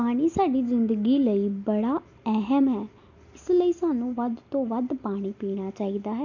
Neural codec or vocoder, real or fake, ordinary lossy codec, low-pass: none; real; none; 7.2 kHz